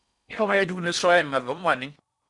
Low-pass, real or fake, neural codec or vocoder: 10.8 kHz; fake; codec, 16 kHz in and 24 kHz out, 0.8 kbps, FocalCodec, streaming, 65536 codes